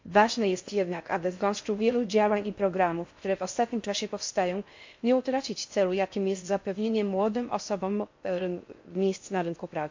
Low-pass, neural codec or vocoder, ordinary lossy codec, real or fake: 7.2 kHz; codec, 16 kHz in and 24 kHz out, 0.6 kbps, FocalCodec, streaming, 4096 codes; MP3, 48 kbps; fake